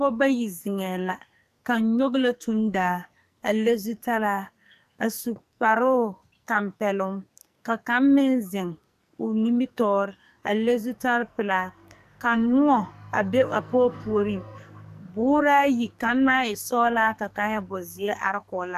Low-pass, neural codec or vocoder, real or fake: 14.4 kHz; codec, 44.1 kHz, 2.6 kbps, SNAC; fake